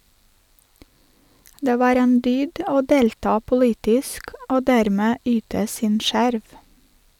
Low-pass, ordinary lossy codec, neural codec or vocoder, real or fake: 19.8 kHz; none; none; real